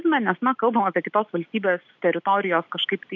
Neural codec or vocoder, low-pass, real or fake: none; 7.2 kHz; real